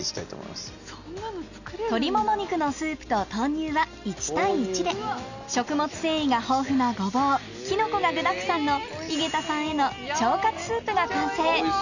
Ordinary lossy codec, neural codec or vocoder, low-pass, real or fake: none; none; 7.2 kHz; real